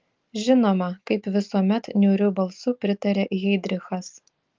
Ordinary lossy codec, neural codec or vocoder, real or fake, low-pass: Opus, 32 kbps; none; real; 7.2 kHz